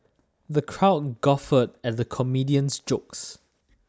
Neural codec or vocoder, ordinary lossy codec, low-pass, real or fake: none; none; none; real